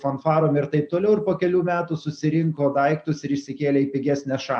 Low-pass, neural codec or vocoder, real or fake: 9.9 kHz; none; real